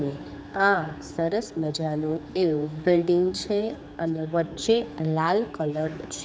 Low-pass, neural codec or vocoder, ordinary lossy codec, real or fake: none; codec, 16 kHz, 4 kbps, X-Codec, HuBERT features, trained on general audio; none; fake